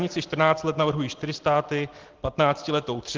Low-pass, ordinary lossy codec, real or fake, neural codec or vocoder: 7.2 kHz; Opus, 16 kbps; real; none